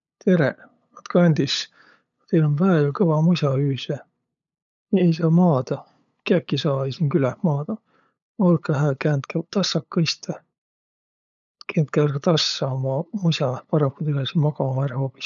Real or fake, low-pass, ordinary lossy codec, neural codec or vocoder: fake; 7.2 kHz; none; codec, 16 kHz, 8 kbps, FunCodec, trained on LibriTTS, 25 frames a second